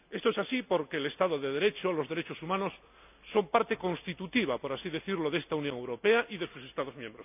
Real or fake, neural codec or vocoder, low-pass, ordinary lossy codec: real; none; 3.6 kHz; none